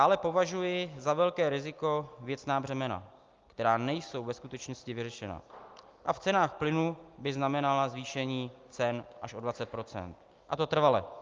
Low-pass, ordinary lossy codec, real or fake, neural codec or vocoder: 7.2 kHz; Opus, 32 kbps; real; none